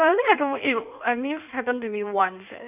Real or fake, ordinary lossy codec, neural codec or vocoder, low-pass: fake; none; codec, 16 kHz in and 24 kHz out, 1.1 kbps, FireRedTTS-2 codec; 3.6 kHz